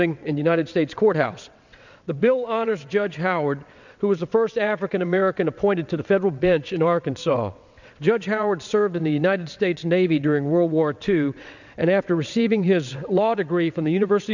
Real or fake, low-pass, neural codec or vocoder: fake; 7.2 kHz; vocoder, 22.05 kHz, 80 mel bands, WaveNeXt